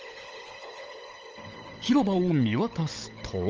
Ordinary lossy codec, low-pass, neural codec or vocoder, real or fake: Opus, 24 kbps; 7.2 kHz; codec, 16 kHz, 16 kbps, FunCodec, trained on LibriTTS, 50 frames a second; fake